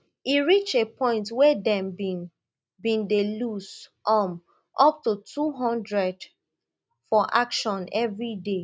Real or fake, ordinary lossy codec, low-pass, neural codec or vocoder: real; none; none; none